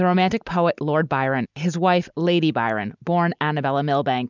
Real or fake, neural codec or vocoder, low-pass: real; none; 7.2 kHz